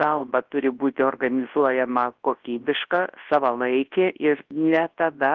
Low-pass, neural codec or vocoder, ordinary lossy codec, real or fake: 7.2 kHz; codec, 24 kHz, 0.9 kbps, WavTokenizer, large speech release; Opus, 16 kbps; fake